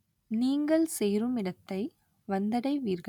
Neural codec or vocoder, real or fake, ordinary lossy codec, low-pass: none; real; none; 19.8 kHz